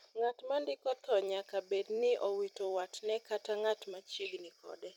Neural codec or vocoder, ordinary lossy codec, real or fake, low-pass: vocoder, 44.1 kHz, 128 mel bands every 256 samples, BigVGAN v2; none; fake; 19.8 kHz